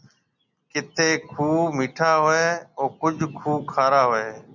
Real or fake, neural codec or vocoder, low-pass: real; none; 7.2 kHz